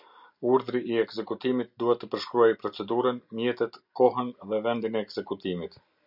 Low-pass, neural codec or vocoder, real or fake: 5.4 kHz; none; real